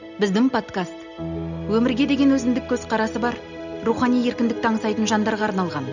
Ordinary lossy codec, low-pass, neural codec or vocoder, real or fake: none; 7.2 kHz; none; real